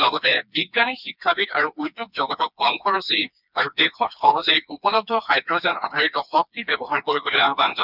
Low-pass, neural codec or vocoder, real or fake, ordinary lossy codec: 5.4 kHz; codec, 16 kHz, 2 kbps, FreqCodec, smaller model; fake; none